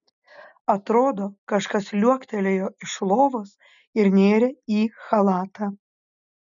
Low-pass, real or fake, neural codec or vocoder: 7.2 kHz; real; none